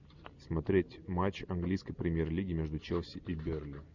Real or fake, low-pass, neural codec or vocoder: real; 7.2 kHz; none